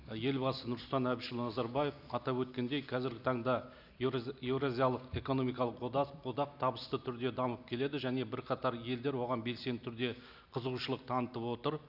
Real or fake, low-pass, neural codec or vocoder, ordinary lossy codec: real; 5.4 kHz; none; none